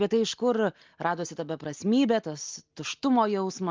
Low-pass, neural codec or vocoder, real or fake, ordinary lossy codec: 7.2 kHz; none; real; Opus, 32 kbps